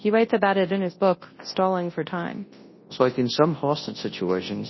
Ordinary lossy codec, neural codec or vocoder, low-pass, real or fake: MP3, 24 kbps; codec, 24 kHz, 0.9 kbps, WavTokenizer, large speech release; 7.2 kHz; fake